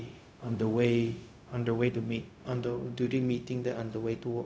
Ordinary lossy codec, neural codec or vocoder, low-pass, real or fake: none; codec, 16 kHz, 0.4 kbps, LongCat-Audio-Codec; none; fake